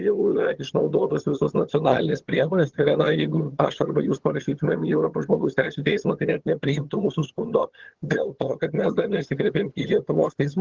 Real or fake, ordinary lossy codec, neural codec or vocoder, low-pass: fake; Opus, 16 kbps; vocoder, 22.05 kHz, 80 mel bands, HiFi-GAN; 7.2 kHz